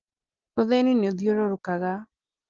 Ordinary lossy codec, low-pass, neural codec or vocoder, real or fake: Opus, 16 kbps; 7.2 kHz; none; real